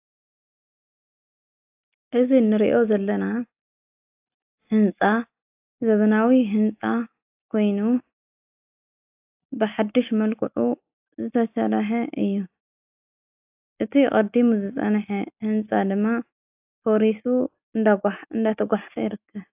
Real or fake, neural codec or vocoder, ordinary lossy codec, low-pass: real; none; AAC, 32 kbps; 3.6 kHz